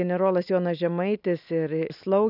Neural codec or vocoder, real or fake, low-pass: none; real; 5.4 kHz